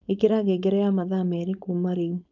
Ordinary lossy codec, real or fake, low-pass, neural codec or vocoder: none; fake; 7.2 kHz; codec, 16 kHz, 4.8 kbps, FACodec